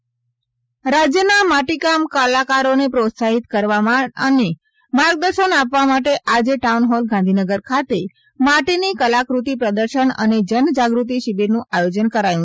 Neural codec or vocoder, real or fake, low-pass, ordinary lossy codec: none; real; none; none